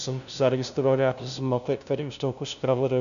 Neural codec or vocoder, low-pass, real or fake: codec, 16 kHz, 0.5 kbps, FunCodec, trained on LibriTTS, 25 frames a second; 7.2 kHz; fake